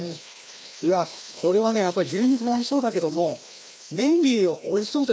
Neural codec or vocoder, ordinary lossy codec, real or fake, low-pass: codec, 16 kHz, 1 kbps, FreqCodec, larger model; none; fake; none